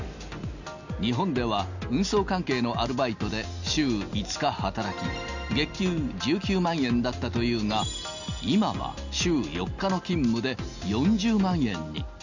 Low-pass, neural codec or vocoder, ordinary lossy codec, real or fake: 7.2 kHz; none; none; real